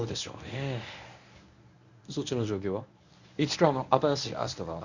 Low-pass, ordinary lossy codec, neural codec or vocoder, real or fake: 7.2 kHz; none; codec, 24 kHz, 0.9 kbps, WavTokenizer, medium speech release version 1; fake